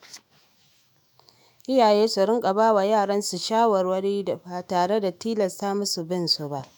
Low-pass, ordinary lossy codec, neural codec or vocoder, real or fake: none; none; autoencoder, 48 kHz, 128 numbers a frame, DAC-VAE, trained on Japanese speech; fake